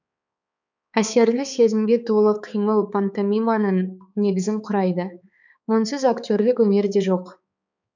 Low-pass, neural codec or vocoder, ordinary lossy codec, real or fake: 7.2 kHz; codec, 16 kHz, 4 kbps, X-Codec, HuBERT features, trained on balanced general audio; none; fake